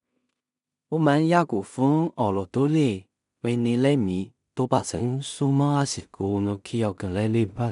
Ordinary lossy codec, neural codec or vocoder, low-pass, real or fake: none; codec, 16 kHz in and 24 kHz out, 0.4 kbps, LongCat-Audio-Codec, two codebook decoder; 10.8 kHz; fake